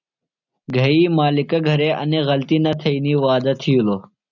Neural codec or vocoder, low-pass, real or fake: none; 7.2 kHz; real